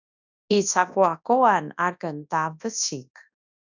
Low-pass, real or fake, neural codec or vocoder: 7.2 kHz; fake; codec, 24 kHz, 0.9 kbps, WavTokenizer, large speech release